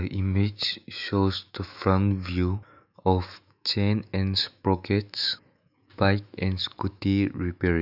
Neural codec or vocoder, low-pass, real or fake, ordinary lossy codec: autoencoder, 48 kHz, 128 numbers a frame, DAC-VAE, trained on Japanese speech; 5.4 kHz; fake; none